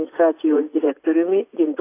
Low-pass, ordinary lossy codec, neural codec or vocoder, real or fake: 3.6 kHz; AAC, 24 kbps; vocoder, 44.1 kHz, 80 mel bands, Vocos; fake